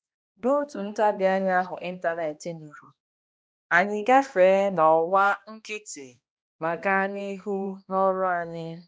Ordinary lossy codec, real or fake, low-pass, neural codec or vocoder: none; fake; none; codec, 16 kHz, 1 kbps, X-Codec, HuBERT features, trained on balanced general audio